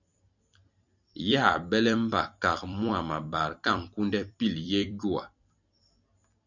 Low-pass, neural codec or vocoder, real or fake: 7.2 kHz; vocoder, 44.1 kHz, 128 mel bands every 256 samples, BigVGAN v2; fake